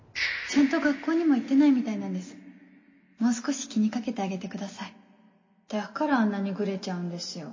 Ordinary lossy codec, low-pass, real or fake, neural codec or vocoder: MP3, 32 kbps; 7.2 kHz; real; none